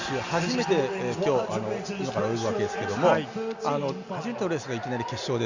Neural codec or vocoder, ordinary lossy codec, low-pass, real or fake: none; Opus, 64 kbps; 7.2 kHz; real